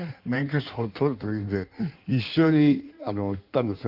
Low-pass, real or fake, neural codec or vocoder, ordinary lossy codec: 5.4 kHz; fake; codec, 16 kHz in and 24 kHz out, 1.1 kbps, FireRedTTS-2 codec; Opus, 32 kbps